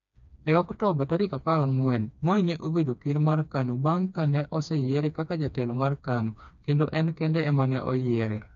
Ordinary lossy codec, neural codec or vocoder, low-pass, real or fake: none; codec, 16 kHz, 2 kbps, FreqCodec, smaller model; 7.2 kHz; fake